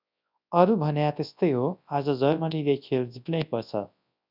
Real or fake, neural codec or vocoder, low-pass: fake; codec, 24 kHz, 0.9 kbps, WavTokenizer, large speech release; 5.4 kHz